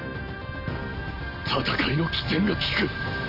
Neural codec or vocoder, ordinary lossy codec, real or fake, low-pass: none; none; real; 5.4 kHz